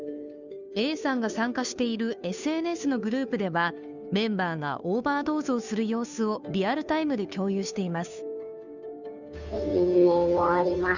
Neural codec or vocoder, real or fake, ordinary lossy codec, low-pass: codec, 16 kHz, 2 kbps, FunCodec, trained on Chinese and English, 25 frames a second; fake; none; 7.2 kHz